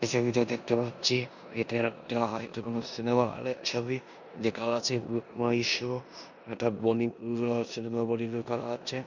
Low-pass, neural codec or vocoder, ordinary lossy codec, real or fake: 7.2 kHz; codec, 16 kHz in and 24 kHz out, 0.9 kbps, LongCat-Audio-Codec, four codebook decoder; none; fake